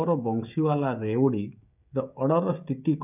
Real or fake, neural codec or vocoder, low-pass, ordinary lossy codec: fake; codec, 16 kHz, 16 kbps, FreqCodec, smaller model; 3.6 kHz; none